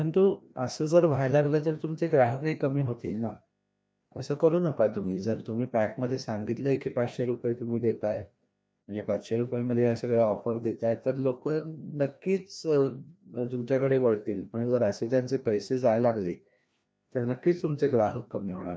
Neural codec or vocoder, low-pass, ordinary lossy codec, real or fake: codec, 16 kHz, 1 kbps, FreqCodec, larger model; none; none; fake